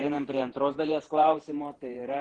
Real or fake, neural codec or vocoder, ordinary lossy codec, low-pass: fake; vocoder, 48 kHz, 128 mel bands, Vocos; Opus, 16 kbps; 9.9 kHz